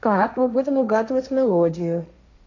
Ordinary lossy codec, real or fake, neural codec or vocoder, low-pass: none; fake; codec, 16 kHz, 1.1 kbps, Voila-Tokenizer; 7.2 kHz